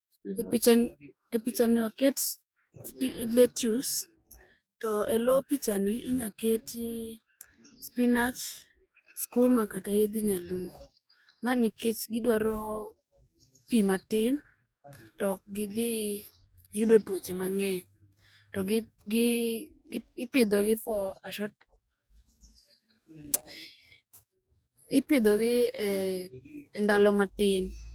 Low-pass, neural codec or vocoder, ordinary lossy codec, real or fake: none; codec, 44.1 kHz, 2.6 kbps, DAC; none; fake